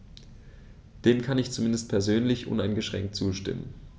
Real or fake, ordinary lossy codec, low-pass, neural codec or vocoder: real; none; none; none